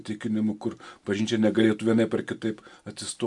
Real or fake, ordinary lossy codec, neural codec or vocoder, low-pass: real; MP3, 96 kbps; none; 10.8 kHz